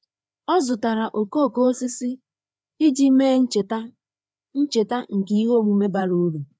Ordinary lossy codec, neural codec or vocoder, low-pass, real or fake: none; codec, 16 kHz, 4 kbps, FreqCodec, larger model; none; fake